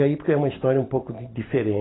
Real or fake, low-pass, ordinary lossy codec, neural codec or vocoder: real; 7.2 kHz; AAC, 16 kbps; none